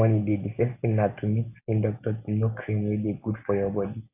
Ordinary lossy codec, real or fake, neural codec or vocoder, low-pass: AAC, 32 kbps; real; none; 3.6 kHz